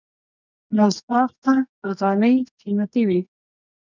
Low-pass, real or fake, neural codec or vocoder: 7.2 kHz; fake; codec, 24 kHz, 0.9 kbps, WavTokenizer, medium music audio release